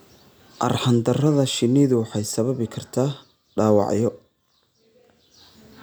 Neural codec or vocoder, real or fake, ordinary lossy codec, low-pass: none; real; none; none